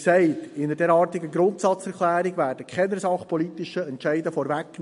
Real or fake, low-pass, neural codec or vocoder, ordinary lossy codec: real; 10.8 kHz; none; MP3, 48 kbps